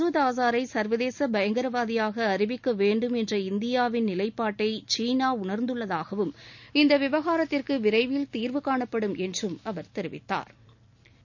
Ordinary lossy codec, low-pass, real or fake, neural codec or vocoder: none; 7.2 kHz; real; none